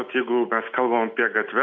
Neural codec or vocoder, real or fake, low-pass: none; real; 7.2 kHz